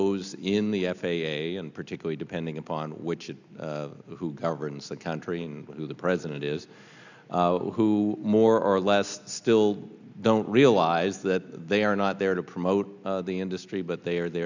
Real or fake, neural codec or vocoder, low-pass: real; none; 7.2 kHz